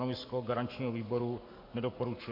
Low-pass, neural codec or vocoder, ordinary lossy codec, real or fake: 5.4 kHz; codec, 44.1 kHz, 7.8 kbps, Pupu-Codec; MP3, 32 kbps; fake